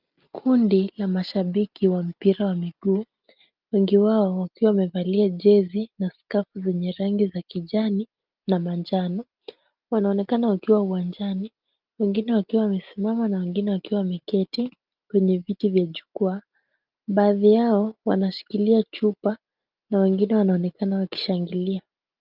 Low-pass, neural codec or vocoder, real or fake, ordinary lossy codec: 5.4 kHz; none; real; Opus, 24 kbps